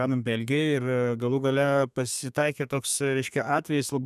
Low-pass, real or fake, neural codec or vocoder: 14.4 kHz; fake; codec, 32 kHz, 1.9 kbps, SNAC